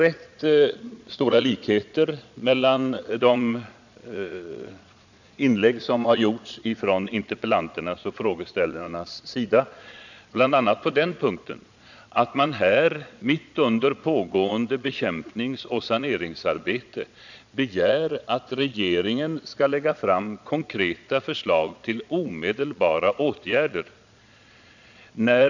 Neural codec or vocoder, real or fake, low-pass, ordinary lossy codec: vocoder, 22.05 kHz, 80 mel bands, Vocos; fake; 7.2 kHz; none